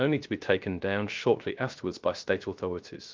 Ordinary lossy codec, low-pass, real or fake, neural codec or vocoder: Opus, 32 kbps; 7.2 kHz; fake; codec, 16 kHz, 0.3 kbps, FocalCodec